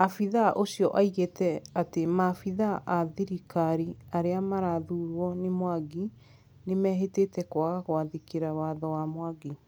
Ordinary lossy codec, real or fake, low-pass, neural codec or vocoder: none; real; none; none